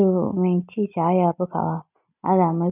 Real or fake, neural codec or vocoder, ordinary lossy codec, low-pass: real; none; none; 3.6 kHz